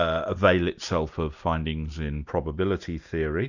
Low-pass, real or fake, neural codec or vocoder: 7.2 kHz; real; none